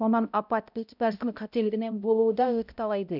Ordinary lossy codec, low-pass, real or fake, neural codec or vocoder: none; 5.4 kHz; fake; codec, 16 kHz, 0.5 kbps, X-Codec, HuBERT features, trained on balanced general audio